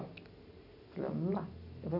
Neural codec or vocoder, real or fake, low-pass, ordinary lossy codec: none; real; 5.4 kHz; none